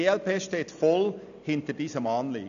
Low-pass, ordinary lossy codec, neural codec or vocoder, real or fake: 7.2 kHz; none; none; real